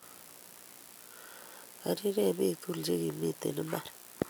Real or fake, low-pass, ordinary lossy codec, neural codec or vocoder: real; none; none; none